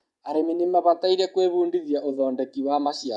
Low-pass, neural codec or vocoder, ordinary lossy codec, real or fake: 10.8 kHz; vocoder, 24 kHz, 100 mel bands, Vocos; none; fake